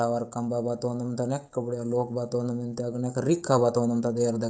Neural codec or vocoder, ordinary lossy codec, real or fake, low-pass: codec, 16 kHz, 16 kbps, FreqCodec, smaller model; none; fake; none